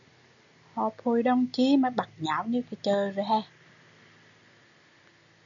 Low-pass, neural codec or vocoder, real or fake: 7.2 kHz; none; real